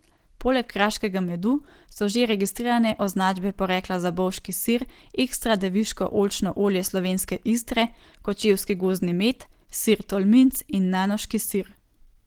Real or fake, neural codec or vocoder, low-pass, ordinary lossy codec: real; none; 19.8 kHz; Opus, 16 kbps